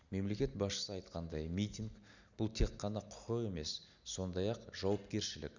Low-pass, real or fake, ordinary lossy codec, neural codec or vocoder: 7.2 kHz; real; none; none